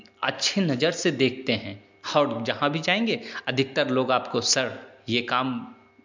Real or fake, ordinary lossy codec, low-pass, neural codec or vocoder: real; MP3, 64 kbps; 7.2 kHz; none